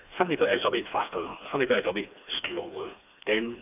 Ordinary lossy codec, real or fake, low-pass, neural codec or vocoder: none; fake; 3.6 kHz; codec, 16 kHz, 2 kbps, FreqCodec, smaller model